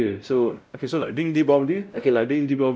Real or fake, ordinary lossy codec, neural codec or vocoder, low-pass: fake; none; codec, 16 kHz, 0.5 kbps, X-Codec, WavLM features, trained on Multilingual LibriSpeech; none